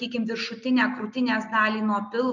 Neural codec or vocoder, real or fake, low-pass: none; real; 7.2 kHz